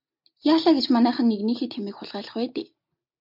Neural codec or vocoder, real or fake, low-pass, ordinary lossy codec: none; real; 5.4 kHz; MP3, 48 kbps